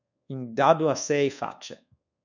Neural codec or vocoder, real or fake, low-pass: codec, 24 kHz, 1.2 kbps, DualCodec; fake; 7.2 kHz